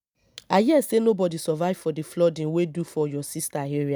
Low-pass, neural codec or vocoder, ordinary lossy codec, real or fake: none; none; none; real